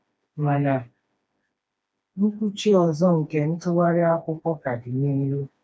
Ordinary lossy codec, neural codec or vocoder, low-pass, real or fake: none; codec, 16 kHz, 2 kbps, FreqCodec, smaller model; none; fake